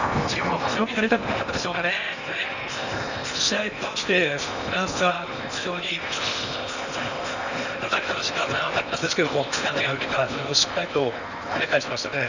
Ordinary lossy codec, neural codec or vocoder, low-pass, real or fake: none; codec, 16 kHz in and 24 kHz out, 0.8 kbps, FocalCodec, streaming, 65536 codes; 7.2 kHz; fake